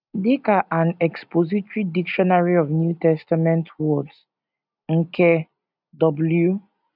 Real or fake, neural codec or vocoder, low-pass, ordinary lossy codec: real; none; 5.4 kHz; none